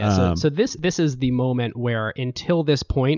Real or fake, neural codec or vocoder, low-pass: real; none; 7.2 kHz